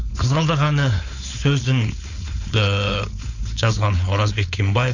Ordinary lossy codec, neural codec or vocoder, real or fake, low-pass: none; codec, 16 kHz, 4.8 kbps, FACodec; fake; 7.2 kHz